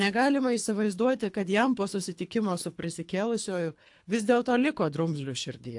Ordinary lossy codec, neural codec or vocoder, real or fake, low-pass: AAC, 64 kbps; codec, 24 kHz, 3 kbps, HILCodec; fake; 10.8 kHz